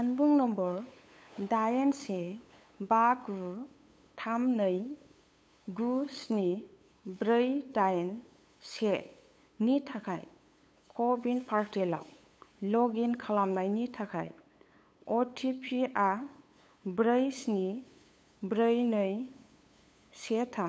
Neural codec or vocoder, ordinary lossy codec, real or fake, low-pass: codec, 16 kHz, 8 kbps, FunCodec, trained on LibriTTS, 25 frames a second; none; fake; none